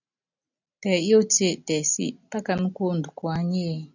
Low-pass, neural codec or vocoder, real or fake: 7.2 kHz; none; real